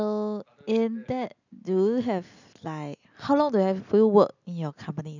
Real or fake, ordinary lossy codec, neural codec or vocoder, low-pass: real; none; none; 7.2 kHz